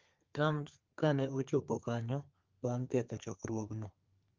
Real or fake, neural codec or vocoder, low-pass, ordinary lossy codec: fake; codec, 32 kHz, 1.9 kbps, SNAC; 7.2 kHz; Opus, 32 kbps